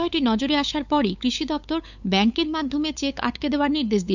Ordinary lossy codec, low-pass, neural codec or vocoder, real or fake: none; 7.2 kHz; codec, 16 kHz, 8 kbps, FunCodec, trained on LibriTTS, 25 frames a second; fake